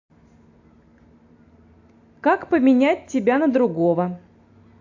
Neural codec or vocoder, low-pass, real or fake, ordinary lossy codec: none; 7.2 kHz; real; AAC, 48 kbps